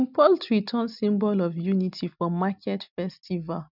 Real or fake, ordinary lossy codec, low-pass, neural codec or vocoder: real; none; 5.4 kHz; none